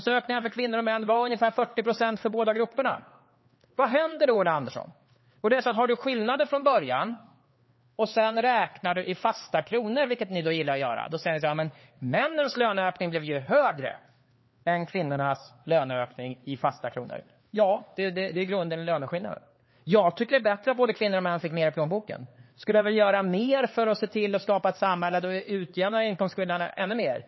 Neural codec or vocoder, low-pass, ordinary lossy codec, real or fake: codec, 16 kHz, 4 kbps, X-Codec, HuBERT features, trained on LibriSpeech; 7.2 kHz; MP3, 24 kbps; fake